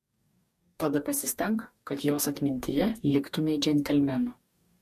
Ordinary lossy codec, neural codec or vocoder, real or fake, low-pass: MP3, 64 kbps; codec, 44.1 kHz, 2.6 kbps, DAC; fake; 14.4 kHz